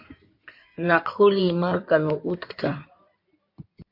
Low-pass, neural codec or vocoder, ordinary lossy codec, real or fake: 5.4 kHz; codec, 16 kHz in and 24 kHz out, 2.2 kbps, FireRedTTS-2 codec; MP3, 32 kbps; fake